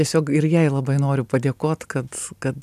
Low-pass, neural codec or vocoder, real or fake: 14.4 kHz; none; real